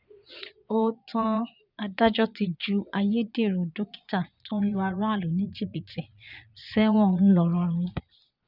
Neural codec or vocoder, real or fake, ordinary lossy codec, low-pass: vocoder, 22.05 kHz, 80 mel bands, Vocos; fake; none; 5.4 kHz